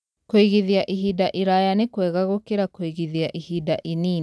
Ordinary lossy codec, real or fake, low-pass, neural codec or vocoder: none; real; none; none